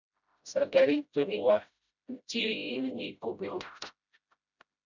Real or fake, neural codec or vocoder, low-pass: fake; codec, 16 kHz, 0.5 kbps, FreqCodec, smaller model; 7.2 kHz